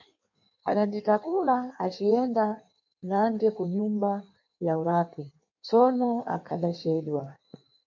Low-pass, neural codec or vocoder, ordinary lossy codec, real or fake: 7.2 kHz; codec, 16 kHz in and 24 kHz out, 1.1 kbps, FireRedTTS-2 codec; MP3, 48 kbps; fake